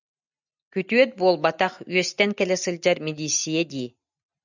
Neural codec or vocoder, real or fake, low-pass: none; real; 7.2 kHz